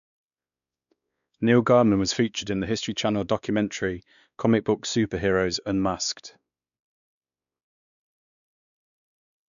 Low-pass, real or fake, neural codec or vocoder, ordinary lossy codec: 7.2 kHz; fake; codec, 16 kHz, 2 kbps, X-Codec, WavLM features, trained on Multilingual LibriSpeech; none